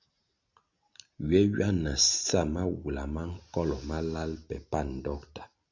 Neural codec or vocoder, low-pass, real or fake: none; 7.2 kHz; real